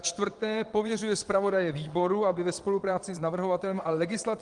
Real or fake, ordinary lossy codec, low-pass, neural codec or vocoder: fake; Opus, 24 kbps; 9.9 kHz; vocoder, 22.05 kHz, 80 mel bands, Vocos